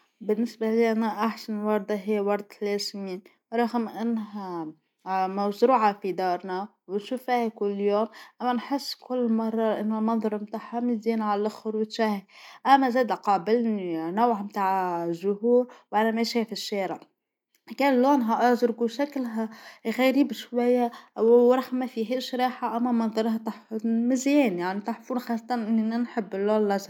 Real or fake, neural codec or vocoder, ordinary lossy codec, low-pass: real; none; none; 19.8 kHz